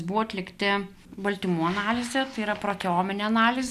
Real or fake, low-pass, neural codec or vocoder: real; 14.4 kHz; none